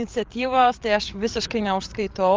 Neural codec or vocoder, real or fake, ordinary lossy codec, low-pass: codec, 16 kHz, 8 kbps, FreqCodec, larger model; fake; Opus, 32 kbps; 7.2 kHz